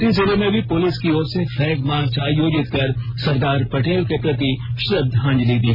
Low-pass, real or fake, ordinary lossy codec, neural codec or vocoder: 5.4 kHz; real; AAC, 48 kbps; none